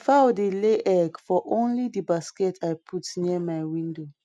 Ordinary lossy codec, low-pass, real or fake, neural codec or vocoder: none; none; real; none